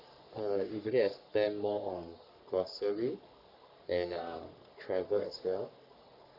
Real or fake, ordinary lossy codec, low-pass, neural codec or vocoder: fake; Opus, 64 kbps; 5.4 kHz; codec, 44.1 kHz, 3.4 kbps, Pupu-Codec